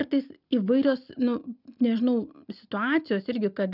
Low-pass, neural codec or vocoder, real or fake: 5.4 kHz; none; real